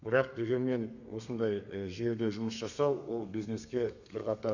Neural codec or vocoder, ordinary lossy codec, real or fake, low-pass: codec, 32 kHz, 1.9 kbps, SNAC; none; fake; 7.2 kHz